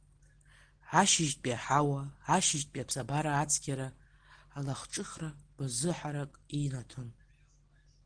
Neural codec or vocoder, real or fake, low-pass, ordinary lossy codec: none; real; 9.9 kHz; Opus, 16 kbps